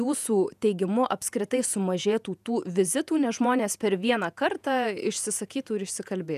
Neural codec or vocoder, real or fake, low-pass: vocoder, 48 kHz, 128 mel bands, Vocos; fake; 14.4 kHz